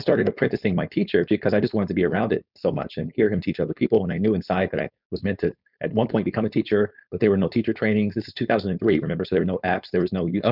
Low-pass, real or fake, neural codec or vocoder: 5.4 kHz; fake; codec, 16 kHz, 4.8 kbps, FACodec